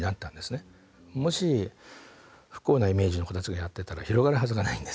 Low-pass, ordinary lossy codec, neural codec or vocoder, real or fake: none; none; none; real